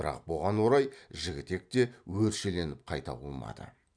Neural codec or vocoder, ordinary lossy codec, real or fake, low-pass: none; none; real; 9.9 kHz